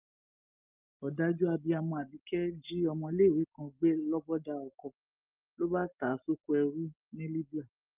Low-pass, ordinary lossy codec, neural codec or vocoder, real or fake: 3.6 kHz; Opus, 24 kbps; none; real